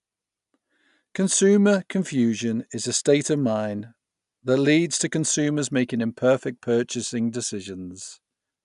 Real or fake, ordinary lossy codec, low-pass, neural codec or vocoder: real; none; 10.8 kHz; none